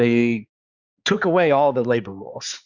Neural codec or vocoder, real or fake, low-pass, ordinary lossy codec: codec, 16 kHz, 4 kbps, X-Codec, HuBERT features, trained on balanced general audio; fake; 7.2 kHz; Opus, 64 kbps